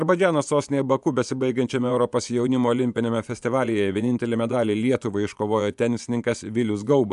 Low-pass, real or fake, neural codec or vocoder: 10.8 kHz; fake; vocoder, 24 kHz, 100 mel bands, Vocos